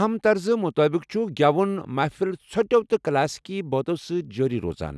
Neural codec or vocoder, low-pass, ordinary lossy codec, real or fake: none; none; none; real